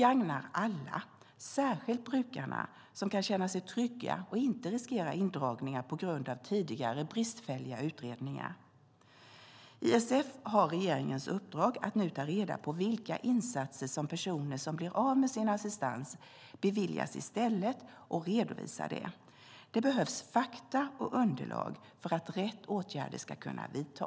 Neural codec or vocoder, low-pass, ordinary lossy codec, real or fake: none; none; none; real